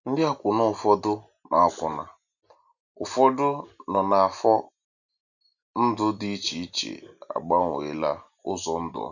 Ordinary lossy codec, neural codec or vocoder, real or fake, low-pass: none; none; real; 7.2 kHz